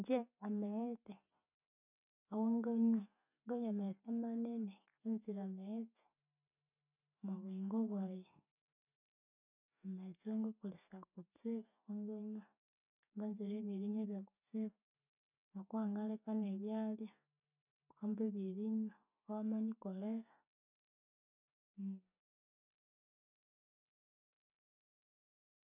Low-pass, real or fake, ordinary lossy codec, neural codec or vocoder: 3.6 kHz; real; none; none